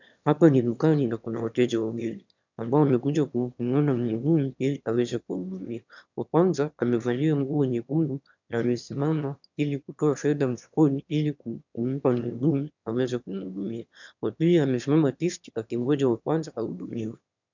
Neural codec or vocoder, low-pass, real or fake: autoencoder, 22.05 kHz, a latent of 192 numbers a frame, VITS, trained on one speaker; 7.2 kHz; fake